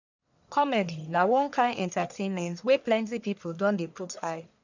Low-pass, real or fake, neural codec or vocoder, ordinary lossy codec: 7.2 kHz; fake; codec, 44.1 kHz, 1.7 kbps, Pupu-Codec; none